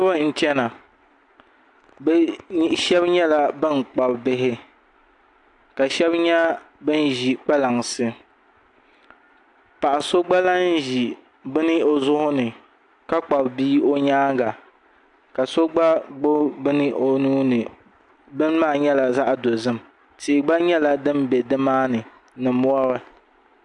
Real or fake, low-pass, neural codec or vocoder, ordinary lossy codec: real; 10.8 kHz; none; AAC, 64 kbps